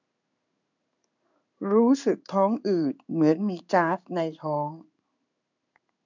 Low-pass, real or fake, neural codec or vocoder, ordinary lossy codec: 7.2 kHz; fake; codec, 16 kHz in and 24 kHz out, 1 kbps, XY-Tokenizer; none